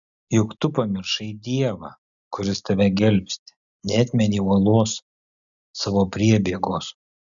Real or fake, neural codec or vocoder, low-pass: real; none; 7.2 kHz